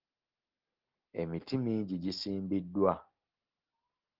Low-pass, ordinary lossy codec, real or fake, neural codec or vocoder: 5.4 kHz; Opus, 32 kbps; real; none